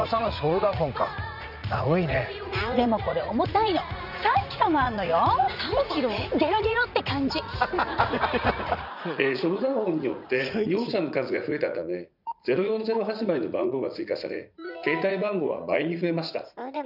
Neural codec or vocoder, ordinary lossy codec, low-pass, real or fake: vocoder, 44.1 kHz, 80 mel bands, Vocos; none; 5.4 kHz; fake